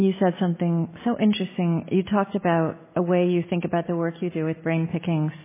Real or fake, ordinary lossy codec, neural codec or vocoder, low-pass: real; MP3, 16 kbps; none; 3.6 kHz